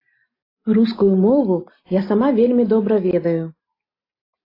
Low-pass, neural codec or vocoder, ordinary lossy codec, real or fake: 5.4 kHz; none; AAC, 24 kbps; real